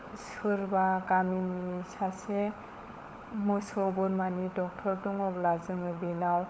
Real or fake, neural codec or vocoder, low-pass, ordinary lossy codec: fake; codec, 16 kHz, 16 kbps, FunCodec, trained on LibriTTS, 50 frames a second; none; none